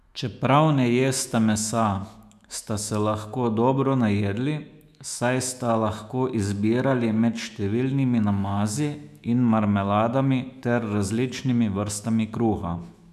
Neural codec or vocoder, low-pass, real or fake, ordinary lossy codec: autoencoder, 48 kHz, 128 numbers a frame, DAC-VAE, trained on Japanese speech; 14.4 kHz; fake; none